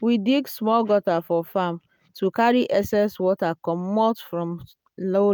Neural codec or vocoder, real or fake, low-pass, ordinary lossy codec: none; real; none; none